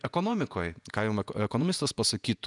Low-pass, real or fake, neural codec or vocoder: 10.8 kHz; fake; vocoder, 48 kHz, 128 mel bands, Vocos